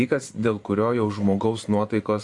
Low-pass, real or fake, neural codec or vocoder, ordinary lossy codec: 10.8 kHz; fake; vocoder, 24 kHz, 100 mel bands, Vocos; Opus, 64 kbps